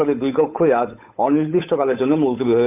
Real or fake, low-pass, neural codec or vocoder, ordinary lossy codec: fake; 3.6 kHz; codec, 16 kHz, 8 kbps, FunCodec, trained on Chinese and English, 25 frames a second; none